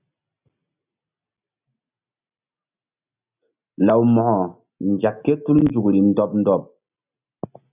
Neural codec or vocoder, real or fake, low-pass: none; real; 3.6 kHz